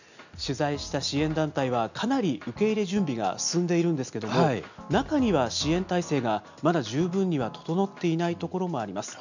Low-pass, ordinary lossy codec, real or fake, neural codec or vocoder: 7.2 kHz; none; real; none